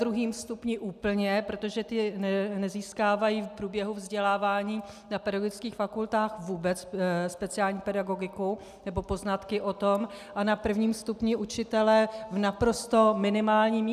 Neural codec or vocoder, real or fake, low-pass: none; real; 14.4 kHz